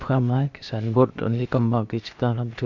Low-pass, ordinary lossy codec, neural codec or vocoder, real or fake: 7.2 kHz; AAC, 48 kbps; codec, 16 kHz, 0.8 kbps, ZipCodec; fake